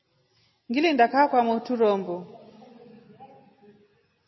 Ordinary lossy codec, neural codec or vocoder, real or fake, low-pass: MP3, 24 kbps; none; real; 7.2 kHz